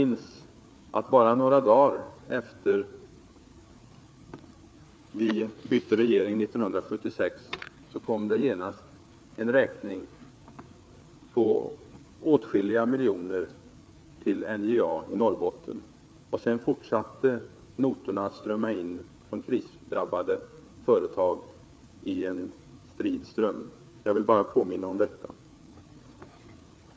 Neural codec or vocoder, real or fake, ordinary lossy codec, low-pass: codec, 16 kHz, 4 kbps, FreqCodec, larger model; fake; none; none